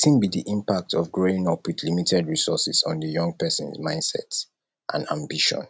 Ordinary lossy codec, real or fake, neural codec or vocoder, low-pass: none; real; none; none